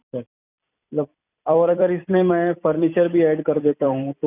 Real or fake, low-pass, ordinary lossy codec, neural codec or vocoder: fake; 3.6 kHz; none; vocoder, 44.1 kHz, 128 mel bands every 256 samples, BigVGAN v2